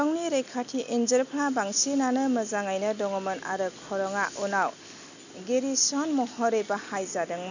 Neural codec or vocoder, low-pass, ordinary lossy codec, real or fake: none; 7.2 kHz; none; real